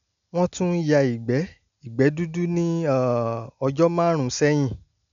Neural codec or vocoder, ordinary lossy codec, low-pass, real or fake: none; none; 7.2 kHz; real